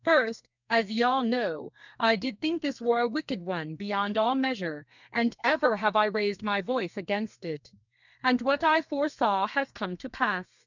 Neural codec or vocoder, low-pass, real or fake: codec, 32 kHz, 1.9 kbps, SNAC; 7.2 kHz; fake